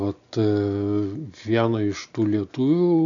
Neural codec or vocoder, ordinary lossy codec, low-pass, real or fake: none; MP3, 96 kbps; 7.2 kHz; real